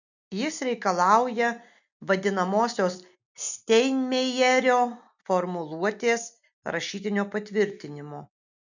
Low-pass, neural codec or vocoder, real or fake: 7.2 kHz; none; real